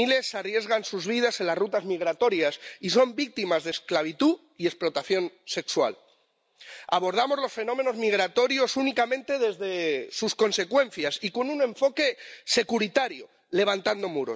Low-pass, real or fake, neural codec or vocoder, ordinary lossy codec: none; real; none; none